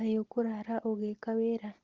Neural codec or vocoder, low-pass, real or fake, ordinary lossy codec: none; 7.2 kHz; real; Opus, 16 kbps